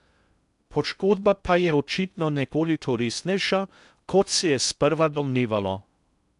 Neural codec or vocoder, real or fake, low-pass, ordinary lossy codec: codec, 16 kHz in and 24 kHz out, 0.6 kbps, FocalCodec, streaming, 2048 codes; fake; 10.8 kHz; none